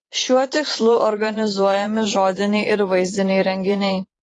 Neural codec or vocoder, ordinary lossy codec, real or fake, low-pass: vocoder, 22.05 kHz, 80 mel bands, Vocos; AAC, 32 kbps; fake; 9.9 kHz